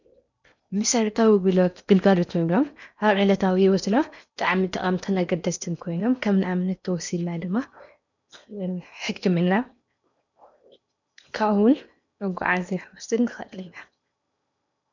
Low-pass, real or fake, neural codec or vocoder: 7.2 kHz; fake; codec, 16 kHz in and 24 kHz out, 0.8 kbps, FocalCodec, streaming, 65536 codes